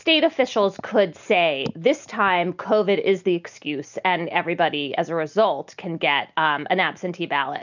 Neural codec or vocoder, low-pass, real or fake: none; 7.2 kHz; real